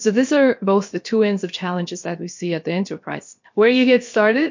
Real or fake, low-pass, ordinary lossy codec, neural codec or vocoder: fake; 7.2 kHz; MP3, 48 kbps; codec, 16 kHz, about 1 kbps, DyCAST, with the encoder's durations